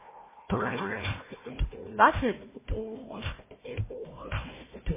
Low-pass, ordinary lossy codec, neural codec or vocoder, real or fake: 3.6 kHz; MP3, 16 kbps; codec, 16 kHz, 1 kbps, FunCodec, trained on Chinese and English, 50 frames a second; fake